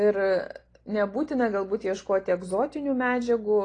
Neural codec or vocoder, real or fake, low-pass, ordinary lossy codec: none; real; 9.9 kHz; AAC, 32 kbps